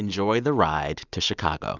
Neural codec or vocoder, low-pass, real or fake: none; 7.2 kHz; real